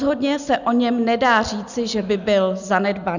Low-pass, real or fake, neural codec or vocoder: 7.2 kHz; real; none